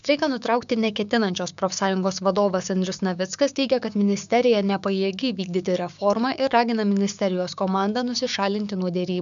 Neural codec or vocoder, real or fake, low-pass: codec, 16 kHz, 6 kbps, DAC; fake; 7.2 kHz